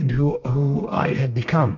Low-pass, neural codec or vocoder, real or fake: 7.2 kHz; codec, 32 kHz, 1.9 kbps, SNAC; fake